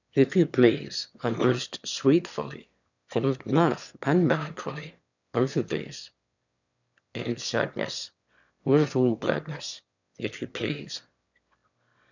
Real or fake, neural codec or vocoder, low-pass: fake; autoencoder, 22.05 kHz, a latent of 192 numbers a frame, VITS, trained on one speaker; 7.2 kHz